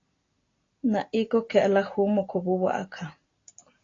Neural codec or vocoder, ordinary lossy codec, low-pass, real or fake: none; AAC, 32 kbps; 7.2 kHz; real